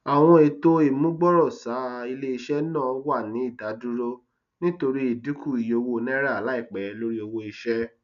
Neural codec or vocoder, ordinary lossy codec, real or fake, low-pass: none; none; real; 7.2 kHz